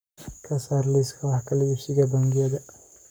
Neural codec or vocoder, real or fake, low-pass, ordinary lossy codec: codec, 44.1 kHz, 7.8 kbps, Pupu-Codec; fake; none; none